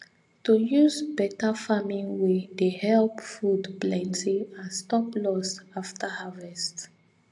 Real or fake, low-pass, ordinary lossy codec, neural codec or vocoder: real; 10.8 kHz; AAC, 64 kbps; none